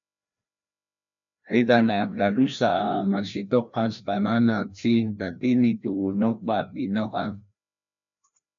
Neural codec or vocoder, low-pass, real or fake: codec, 16 kHz, 1 kbps, FreqCodec, larger model; 7.2 kHz; fake